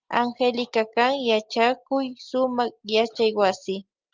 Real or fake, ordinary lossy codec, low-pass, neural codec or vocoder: real; Opus, 24 kbps; 7.2 kHz; none